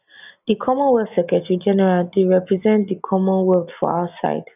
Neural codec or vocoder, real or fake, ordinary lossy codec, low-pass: none; real; none; 3.6 kHz